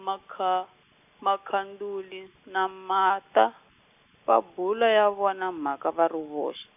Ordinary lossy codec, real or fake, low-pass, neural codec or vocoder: none; real; 3.6 kHz; none